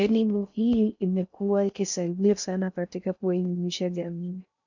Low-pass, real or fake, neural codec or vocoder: 7.2 kHz; fake; codec, 16 kHz in and 24 kHz out, 0.6 kbps, FocalCodec, streaming, 4096 codes